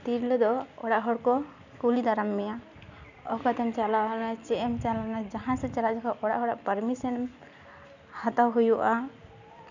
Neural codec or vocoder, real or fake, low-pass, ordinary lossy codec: none; real; 7.2 kHz; none